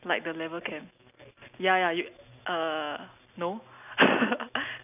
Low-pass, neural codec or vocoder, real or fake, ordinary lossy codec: 3.6 kHz; none; real; none